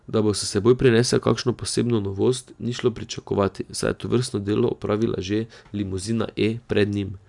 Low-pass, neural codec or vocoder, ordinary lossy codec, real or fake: 10.8 kHz; none; none; real